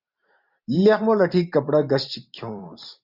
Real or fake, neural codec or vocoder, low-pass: fake; vocoder, 44.1 kHz, 128 mel bands every 512 samples, BigVGAN v2; 5.4 kHz